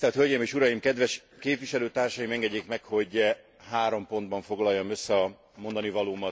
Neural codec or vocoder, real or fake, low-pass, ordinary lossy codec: none; real; none; none